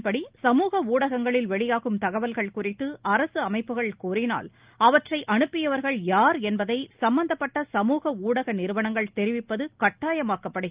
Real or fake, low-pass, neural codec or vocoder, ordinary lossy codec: real; 3.6 kHz; none; Opus, 32 kbps